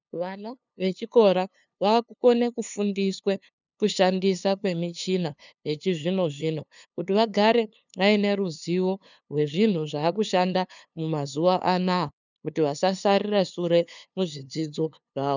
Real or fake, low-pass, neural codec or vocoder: fake; 7.2 kHz; codec, 16 kHz, 2 kbps, FunCodec, trained on LibriTTS, 25 frames a second